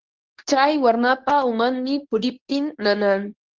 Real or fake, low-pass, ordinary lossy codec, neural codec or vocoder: fake; 7.2 kHz; Opus, 16 kbps; codec, 24 kHz, 0.9 kbps, WavTokenizer, medium speech release version 2